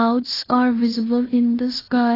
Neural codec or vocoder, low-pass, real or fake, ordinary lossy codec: codec, 16 kHz in and 24 kHz out, 0.9 kbps, LongCat-Audio-Codec, fine tuned four codebook decoder; 5.4 kHz; fake; AAC, 24 kbps